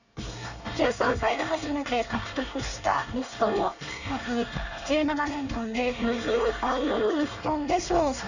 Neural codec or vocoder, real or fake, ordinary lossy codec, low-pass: codec, 24 kHz, 1 kbps, SNAC; fake; none; 7.2 kHz